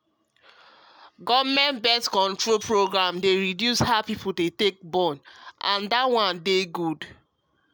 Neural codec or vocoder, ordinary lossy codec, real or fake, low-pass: none; none; real; none